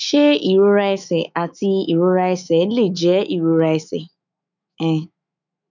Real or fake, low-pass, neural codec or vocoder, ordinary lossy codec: fake; 7.2 kHz; autoencoder, 48 kHz, 128 numbers a frame, DAC-VAE, trained on Japanese speech; none